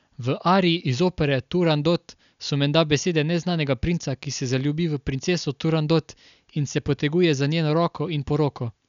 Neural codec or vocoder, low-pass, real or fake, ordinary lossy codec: none; 7.2 kHz; real; none